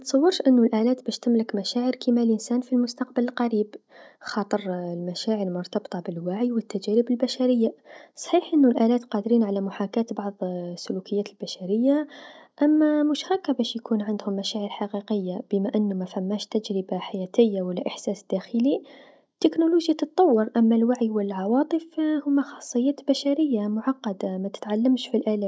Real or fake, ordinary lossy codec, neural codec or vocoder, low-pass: real; none; none; none